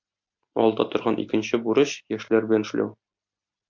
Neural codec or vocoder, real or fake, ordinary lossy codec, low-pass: none; real; MP3, 64 kbps; 7.2 kHz